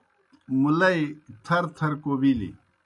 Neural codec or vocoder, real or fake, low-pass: none; real; 10.8 kHz